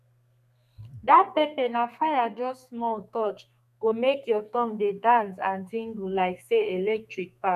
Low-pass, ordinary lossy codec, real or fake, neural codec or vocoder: 14.4 kHz; none; fake; codec, 32 kHz, 1.9 kbps, SNAC